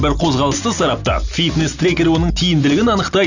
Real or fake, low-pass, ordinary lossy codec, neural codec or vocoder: real; 7.2 kHz; none; none